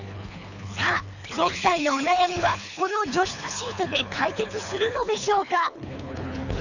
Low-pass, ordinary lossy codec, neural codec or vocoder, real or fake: 7.2 kHz; none; codec, 24 kHz, 3 kbps, HILCodec; fake